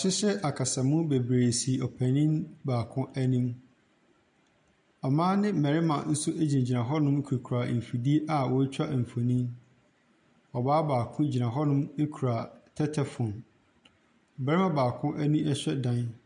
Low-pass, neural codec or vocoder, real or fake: 9.9 kHz; none; real